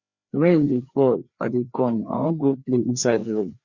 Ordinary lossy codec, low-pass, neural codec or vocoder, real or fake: Opus, 64 kbps; 7.2 kHz; codec, 16 kHz, 2 kbps, FreqCodec, larger model; fake